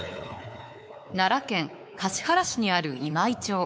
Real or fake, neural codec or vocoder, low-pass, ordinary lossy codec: fake; codec, 16 kHz, 4 kbps, X-Codec, WavLM features, trained on Multilingual LibriSpeech; none; none